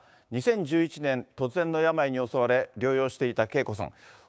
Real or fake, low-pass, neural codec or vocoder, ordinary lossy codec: fake; none; codec, 16 kHz, 6 kbps, DAC; none